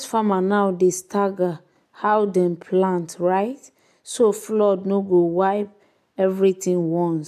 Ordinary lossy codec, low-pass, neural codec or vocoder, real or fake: MP3, 96 kbps; 14.4 kHz; none; real